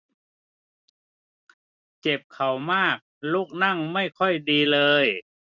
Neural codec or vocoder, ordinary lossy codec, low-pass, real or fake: none; none; 7.2 kHz; real